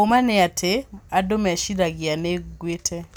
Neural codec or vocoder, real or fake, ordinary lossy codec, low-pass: none; real; none; none